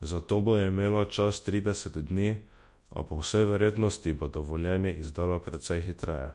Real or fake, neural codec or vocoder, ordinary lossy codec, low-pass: fake; codec, 24 kHz, 0.9 kbps, WavTokenizer, large speech release; MP3, 48 kbps; 10.8 kHz